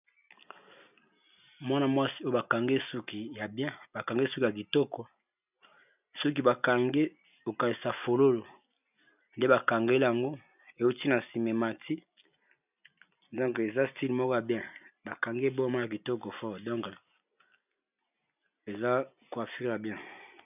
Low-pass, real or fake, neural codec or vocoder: 3.6 kHz; real; none